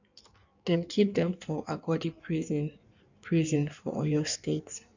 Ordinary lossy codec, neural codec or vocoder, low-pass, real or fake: none; codec, 16 kHz in and 24 kHz out, 1.1 kbps, FireRedTTS-2 codec; 7.2 kHz; fake